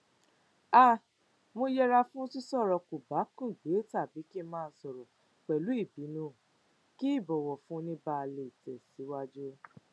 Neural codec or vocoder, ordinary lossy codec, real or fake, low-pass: none; none; real; none